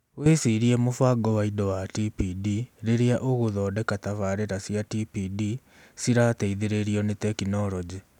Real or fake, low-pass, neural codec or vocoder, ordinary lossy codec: real; 19.8 kHz; none; none